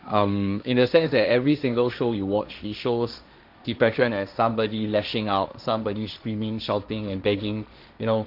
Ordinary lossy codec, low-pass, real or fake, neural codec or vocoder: none; 5.4 kHz; fake; codec, 16 kHz, 1.1 kbps, Voila-Tokenizer